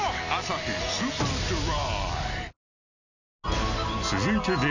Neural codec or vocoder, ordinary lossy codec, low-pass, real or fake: none; none; 7.2 kHz; real